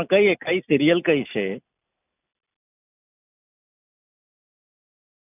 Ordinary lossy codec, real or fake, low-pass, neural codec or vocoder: none; real; 3.6 kHz; none